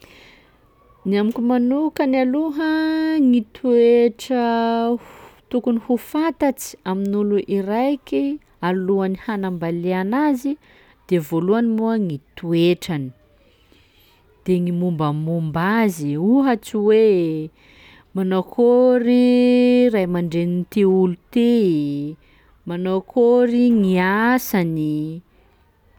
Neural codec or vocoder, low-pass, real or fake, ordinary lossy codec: none; 19.8 kHz; real; none